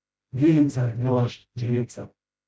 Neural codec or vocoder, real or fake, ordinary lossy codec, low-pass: codec, 16 kHz, 0.5 kbps, FreqCodec, smaller model; fake; none; none